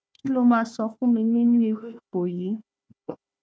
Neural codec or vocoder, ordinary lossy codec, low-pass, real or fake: codec, 16 kHz, 1 kbps, FunCodec, trained on Chinese and English, 50 frames a second; none; none; fake